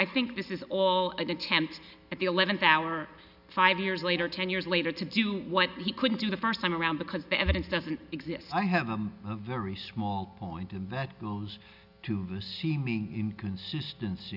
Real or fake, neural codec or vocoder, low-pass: real; none; 5.4 kHz